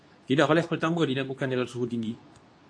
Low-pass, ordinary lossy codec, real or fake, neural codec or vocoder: 9.9 kHz; AAC, 48 kbps; fake; codec, 24 kHz, 0.9 kbps, WavTokenizer, medium speech release version 2